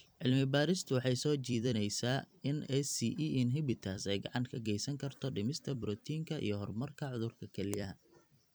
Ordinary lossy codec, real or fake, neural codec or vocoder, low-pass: none; fake; vocoder, 44.1 kHz, 128 mel bands every 256 samples, BigVGAN v2; none